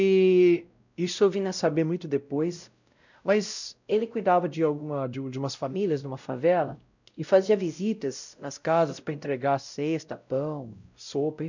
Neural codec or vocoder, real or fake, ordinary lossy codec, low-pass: codec, 16 kHz, 0.5 kbps, X-Codec, WavLM features, trained on Multilingual LibriSpeech; fake; none; 7.2 kHz